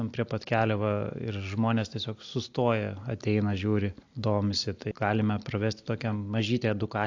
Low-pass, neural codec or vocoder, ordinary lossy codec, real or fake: 7.2 kHz; none; AAC, 48 kbps; real